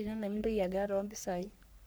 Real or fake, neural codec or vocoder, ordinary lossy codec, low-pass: fake; codec, 44.1 kHz, 3.4 kbps, Pupu-Codec; none; none